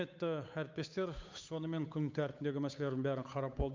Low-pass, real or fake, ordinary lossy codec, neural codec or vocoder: 7.2 kHz; fake; MP3, 64 kbps; codec, 16 kHz, 8 kbps, FunCodec, trained on Chinese and English, 25 frames a second